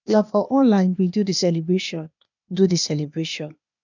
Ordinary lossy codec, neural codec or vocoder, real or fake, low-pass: none; codec, 16 kHz, 0.8 kbps, ZipCodec; fake; 7.2 kHz